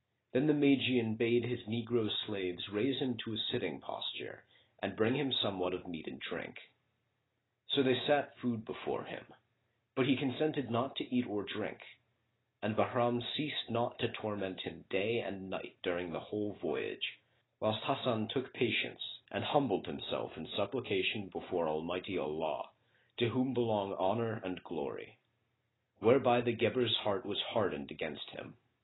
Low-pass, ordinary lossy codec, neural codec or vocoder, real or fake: 7.2 kHz; AAC, 16 kbps; none; real